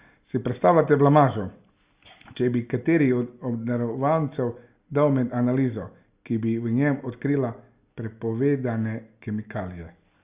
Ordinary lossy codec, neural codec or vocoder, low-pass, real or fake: Opus, 64 kbps; none; 3.6 kHz; real